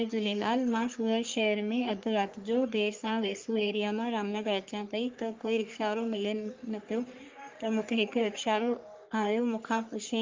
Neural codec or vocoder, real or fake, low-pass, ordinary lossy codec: codec, 44.1 kHz, 3.4 kbps, Pupu-Codec; fake; 7.2 kHz; Opus, 24 kbps